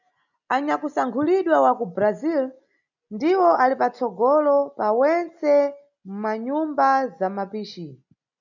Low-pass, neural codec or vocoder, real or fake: 7.2 kHz; none; real